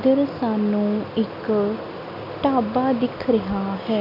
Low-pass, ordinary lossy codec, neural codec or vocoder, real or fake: 5.4 kHz; none; none; real